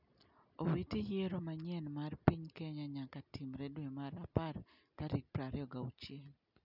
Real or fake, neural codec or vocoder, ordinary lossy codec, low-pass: real; none; none; 5.4 kHz